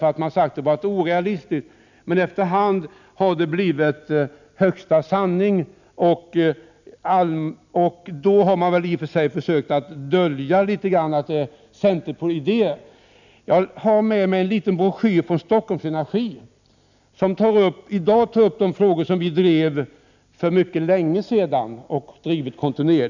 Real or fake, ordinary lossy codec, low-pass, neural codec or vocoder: real; none; 7.2 kHz; none